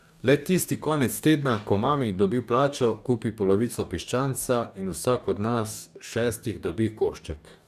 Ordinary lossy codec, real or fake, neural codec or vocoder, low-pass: none; fake; codec, 44.1 kHz, 2.6 kbps, DAC; 14.4 kHz